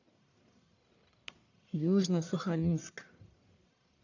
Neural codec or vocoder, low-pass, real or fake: codec, 44.1 kHz, 1.7 kbps, Pupu-Codec; 7.2 kHz; fake